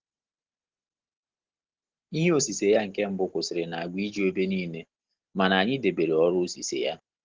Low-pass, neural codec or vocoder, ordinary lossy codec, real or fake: 7.2 kHz; none; Opus, 16 kbps; real